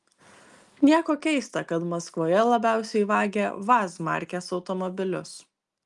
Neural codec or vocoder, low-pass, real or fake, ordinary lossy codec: none; 10.8 kHz; real; Opus, 32 kbps